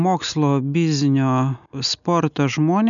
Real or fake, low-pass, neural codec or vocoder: real; 7.2 kHz; none